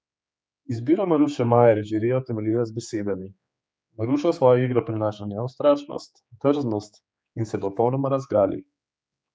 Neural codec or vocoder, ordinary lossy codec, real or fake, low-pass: codec, 16 kHz, 4 kbps, X-Codec, HuBERT features, trained on general audio; none; fake; none